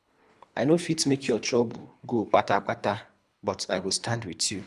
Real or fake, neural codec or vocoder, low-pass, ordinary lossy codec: fake; codec, 24 kHz, 3 kbps, HILCodec; none; none